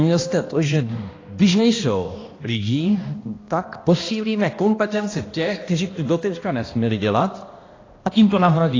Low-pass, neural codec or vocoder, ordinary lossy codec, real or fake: 7.2 kHz; codec, 16 kHz, 1 kbps, X-Codec, HuBERT features, trained on balanced general audio; AAC, 32 kbps; fake